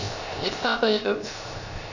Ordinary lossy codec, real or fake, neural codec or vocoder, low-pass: none; fake; codec, 16 kHz, 0.3 kbps, FocalCodec; 7.2 kHz